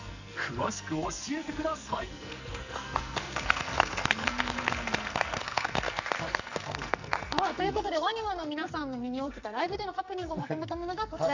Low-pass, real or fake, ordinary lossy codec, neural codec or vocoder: 7.2 kHz; fake; none; codec, 44.1 kHz, 2.6 kbps, SNAC